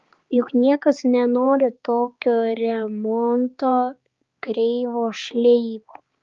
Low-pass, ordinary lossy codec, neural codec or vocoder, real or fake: 7.2 kHz; Opus, 32 kbps; codec, 16 kHz, 4 kbps, X-Codec, HuBERT features, trained on balanced general audio; fake